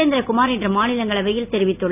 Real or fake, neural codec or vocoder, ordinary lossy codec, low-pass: real; none; none; 3.6 kHz